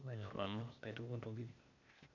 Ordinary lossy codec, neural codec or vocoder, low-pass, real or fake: none; codec, 16 kHz, 0.8 kbps, ZipCodec; 7.2 kHz; fake